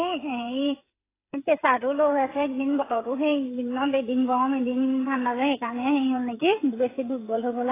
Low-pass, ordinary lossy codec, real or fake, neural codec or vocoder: 3.6 kHz; AAC, 16 kbps; fake; codec, 16 kHz, 8 kbps, FreqCodec, smaller model